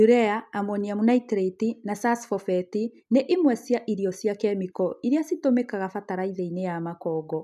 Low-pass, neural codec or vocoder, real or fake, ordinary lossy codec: 14.4 kHz; none; real; none